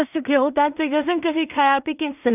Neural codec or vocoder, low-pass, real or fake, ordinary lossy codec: codec, 16 kHz in and 24 kHz out, 0.4 kbps, LongCat-Audio-Codec, two codebook decoder; 3.6 kHz; fake; none